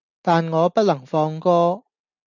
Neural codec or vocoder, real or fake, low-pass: none; real; 7.2 kHz